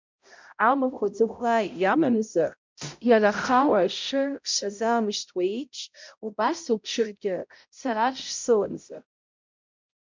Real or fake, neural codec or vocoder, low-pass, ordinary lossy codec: fake; codec, 16 kHz, 0.5 kbps, X-Codec, HuBERT features, trained on balanced general audio; 7.2 kHz; MP3, 64 kbps